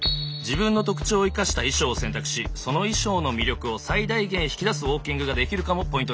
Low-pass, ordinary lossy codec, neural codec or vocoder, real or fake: none; none; none; real